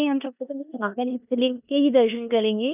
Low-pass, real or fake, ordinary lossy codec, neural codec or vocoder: 3.6 kHz; fake; none; codec, 16 kHz in and 24 kHz out, 0.9 kbps, LongCat-Audio-Codec, four codebook decoder